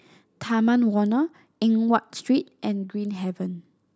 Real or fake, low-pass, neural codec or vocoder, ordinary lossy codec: real; none; none; none